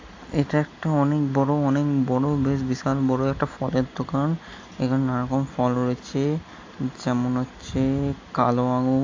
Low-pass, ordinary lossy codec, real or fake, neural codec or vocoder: 7.2 kHz; AAC, 48 kbps; real; none